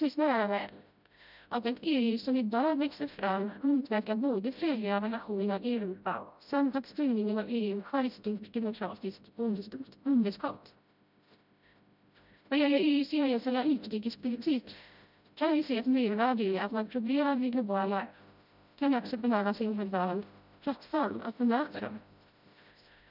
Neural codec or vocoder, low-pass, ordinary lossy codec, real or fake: codec, 16 kHz, 0.5 kbps, FreqCodec, smaller model; 5.4 kHz; none; fake